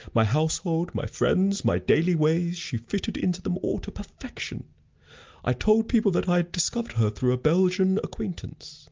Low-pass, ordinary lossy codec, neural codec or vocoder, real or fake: 7.2 kHz; Opus, 32 kbps; none; real